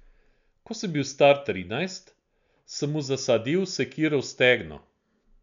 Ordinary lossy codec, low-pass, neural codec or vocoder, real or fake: none; 7.2 kHz; none; real